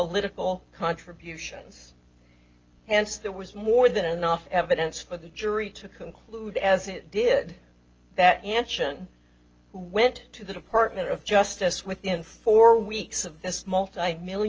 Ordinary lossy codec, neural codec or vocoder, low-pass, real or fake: Opus, 24 kbps; none; 7.2 kHz; real